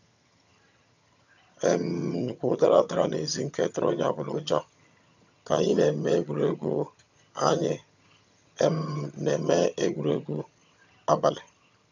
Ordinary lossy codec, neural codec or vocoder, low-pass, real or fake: none; vocoder, 22.05 kHz, 80 mel bands, HiFi-GAN; 7.2 kHz; fake